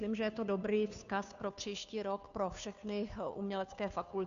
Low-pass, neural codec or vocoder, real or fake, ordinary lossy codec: 7.2 kHz; codec, 16 kHz, 2 kbps, FunCodec, trained on Chinese and English, 25 frames a second; fake; MP3, 96 kbps